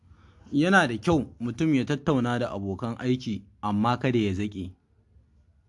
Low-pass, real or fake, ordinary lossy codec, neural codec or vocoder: 10.8 kHz; real; AAC, 64 kbps; none